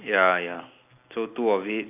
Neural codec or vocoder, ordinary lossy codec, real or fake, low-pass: none; none; real; 3.6 kHz